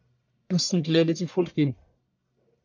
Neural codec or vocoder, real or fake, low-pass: codec, 44.1 kHz, 1.7 kbps, Pupu-Codec; fake; 7.2 kHz